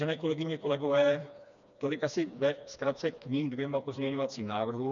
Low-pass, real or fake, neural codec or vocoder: 7.2 kHz; fake; codec, 16 kHz, 2 kbps, FreqCodec, smaller model